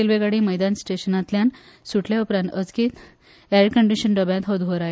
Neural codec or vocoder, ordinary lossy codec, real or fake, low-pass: none; none; real; none